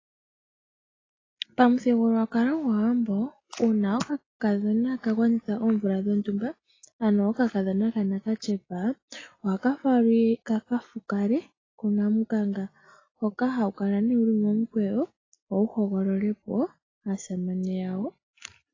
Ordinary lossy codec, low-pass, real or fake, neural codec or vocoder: AAC, 32 kbps; 7.2 kHz; real; none